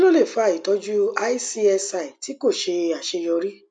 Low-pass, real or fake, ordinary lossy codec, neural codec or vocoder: none; real; none; none